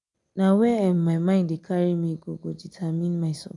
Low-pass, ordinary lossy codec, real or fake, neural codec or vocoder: 10.8 kHz; none; real; none